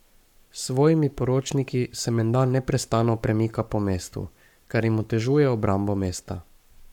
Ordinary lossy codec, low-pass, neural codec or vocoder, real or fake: none; 19.8 kHz; codec, 44.1 kHz, 7.8 kbps, Pupu-Codec; fake